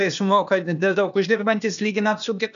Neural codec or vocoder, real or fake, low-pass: codec, 16 kHz, 0.8 kbps, ZipCodec; fake; 7.2 kHz